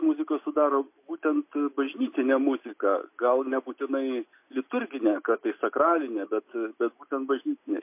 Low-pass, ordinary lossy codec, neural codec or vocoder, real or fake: 3.6 kHz; MP3, 24 kbps; none; real